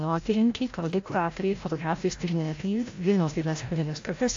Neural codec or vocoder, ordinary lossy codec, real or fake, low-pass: codec, 16 kHz, 0.5 kbps, FreqCodec, larger model; AAC, 48 kbps; fake; 7.2 kHz